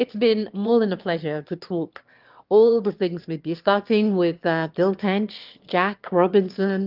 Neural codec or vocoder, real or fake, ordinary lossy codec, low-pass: autoencoder, 22.05 kHz, a latent of 192 numbers a frame, VITS, trained on one speaker; fake; Opus, 32 kbps; 5.4 kHz